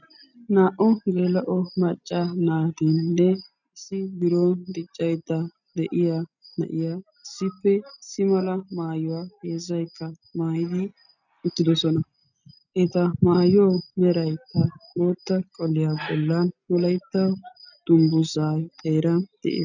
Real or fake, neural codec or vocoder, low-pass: real; none; 7.2 kHz